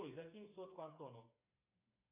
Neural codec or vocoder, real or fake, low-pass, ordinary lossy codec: codec, 16 kHz, 4 kbps, FreqCodec, smaller model; fake; 3.6 kHz; AAC, 32 kbps